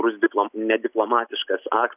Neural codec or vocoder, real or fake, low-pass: none; real; 3.6 kHz